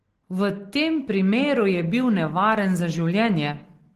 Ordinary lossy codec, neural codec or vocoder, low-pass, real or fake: Opus, 16 kbps; none; 14.4 kHz; real